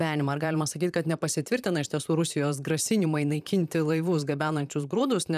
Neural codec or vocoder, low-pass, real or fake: vocoder, 44.1 kHz, 128 mel bands, Pupu-Vocoder; 14.4 kHz; fake